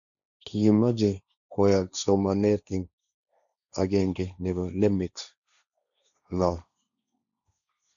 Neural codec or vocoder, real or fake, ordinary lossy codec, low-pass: codec, 16 kHz, 1.1 kbps, Voila-Tokenizer; fake; none; 7.2 kHz